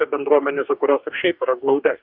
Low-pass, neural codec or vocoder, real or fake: 5.4 kHz; codec, 44.1 kHz, 2.6 kbps, DAC; fake